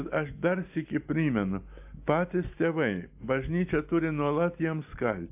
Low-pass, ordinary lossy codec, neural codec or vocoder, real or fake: 3.6 kHz; AAC, 32 kbps; none; real